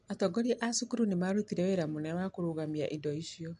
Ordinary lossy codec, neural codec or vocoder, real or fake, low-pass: MP3, 64 kbps; none; real; 10.8 kHz